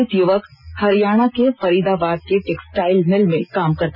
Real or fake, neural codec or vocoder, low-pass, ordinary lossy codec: real; none; 5.4 kHz; none